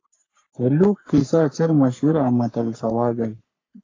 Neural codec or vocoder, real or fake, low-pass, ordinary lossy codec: codec, 44.1 kHz, 3.4 kbps, Pupu-Codec; fake; 7.2 kHz; AAC, 32 kbps